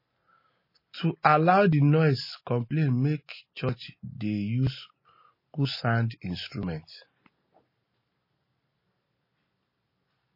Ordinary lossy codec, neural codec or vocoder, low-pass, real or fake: MP3, 24 kbps; none; 5.4 kHz; real